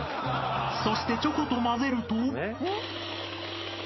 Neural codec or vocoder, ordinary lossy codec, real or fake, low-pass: none; MP3, 24 kbps; real; 7.2 kHz